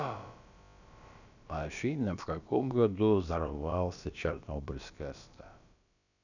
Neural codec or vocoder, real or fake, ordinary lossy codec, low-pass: codec, 16 kHz, about 1 kbps, DyCAST, with the encoder's durations; fake; none; 7.2 kHz